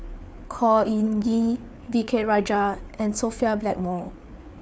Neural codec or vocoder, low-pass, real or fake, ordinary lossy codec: codec, 16 kHz, 4 kbps, FreqCodec, larger model; none; fake; none